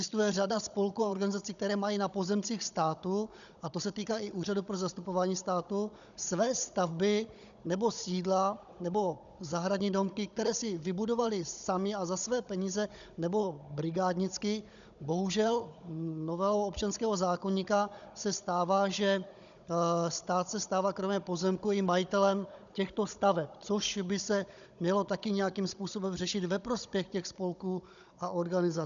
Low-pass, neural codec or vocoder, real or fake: 7.2 kHz; codec, 16 kHz, 16 kbps, FunCodec, trained on Chinese and English, 50 frames a second; fake